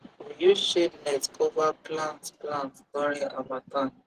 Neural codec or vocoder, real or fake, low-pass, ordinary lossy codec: vocoder, 44.1 kHz, 128 mel bands every 512 samples, BigVGAN v2; fake; 14.4 kHz; Opus, 16 kbps